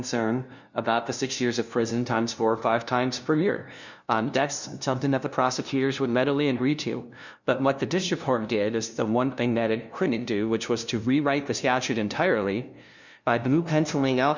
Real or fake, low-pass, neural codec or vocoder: fake; 7.2 kHz; codec, 16 kHz, 0.5 kbps, FunCodec, trained on LibriTTS, 25 frames a second